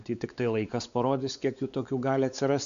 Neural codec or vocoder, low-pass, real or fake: codec, 16 kHz, 4 kbps, X-Codec, WavLM features, trained on Multilingual LibriSpeech; 7.2 kHz; fake